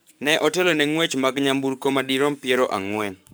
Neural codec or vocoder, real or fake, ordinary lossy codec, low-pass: codec, 44.1 kHz, 7.8 kbps, Pupu-Codec; fake; none; none